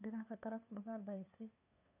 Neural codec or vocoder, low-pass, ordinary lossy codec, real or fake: codec, 16 kHz, 2 kbps, FunCodec, trained on LibriTTS, 25 frames a second; 3.6 kHz; none; fake